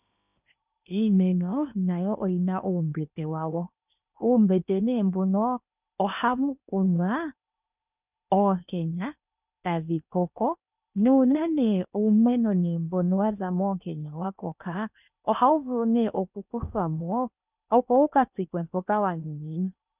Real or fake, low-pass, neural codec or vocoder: fake; 3.6 kHz; codec, 16 kHz in and 24 kHz out, 0.8 kbps, FocalCodec, streaming, 65536 codes